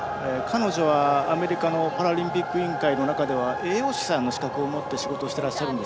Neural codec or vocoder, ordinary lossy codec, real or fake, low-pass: none; none; real; none